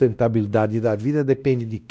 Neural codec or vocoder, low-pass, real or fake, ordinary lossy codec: codec, 16 kHz, 1 kbps, X-Codec, WavLM features, trained on Multilingual LibriSpeech; none; fake; none